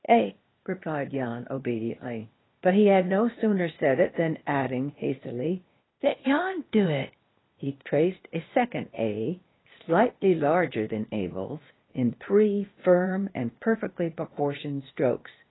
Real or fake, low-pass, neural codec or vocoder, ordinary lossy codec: fake; 7.2 kHz; codec, 16 kHz, 0.8 kbps, ZipCodec; AAC, 16 kbps